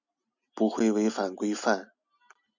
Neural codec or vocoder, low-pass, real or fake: none; 7.2 kHz; real